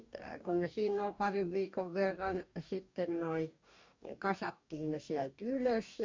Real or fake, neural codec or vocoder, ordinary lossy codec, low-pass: fake; codec, 44.1 kHz, 2.6 kbps, DAC; MP3, 48 kbps; 7.2 kHz